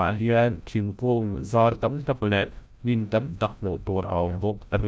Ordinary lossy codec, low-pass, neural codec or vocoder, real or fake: none; none; codec, 16 kHz, 0.5 kbps, FreqCodec, larger model; fake